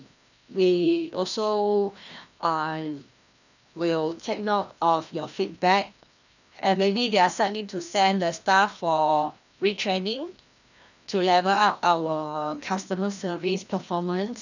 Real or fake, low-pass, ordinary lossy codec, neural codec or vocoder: fake; 7.2 kHz; none; codec, 16 kHz, 1 kbps, FreqCodec, larger model